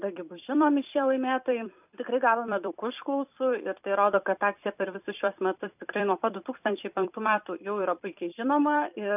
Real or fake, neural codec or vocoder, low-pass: fake; vocoder, 44.1 kHz, 128 mel bands every 256 samples, BigVGAN v2; 3.6 kHz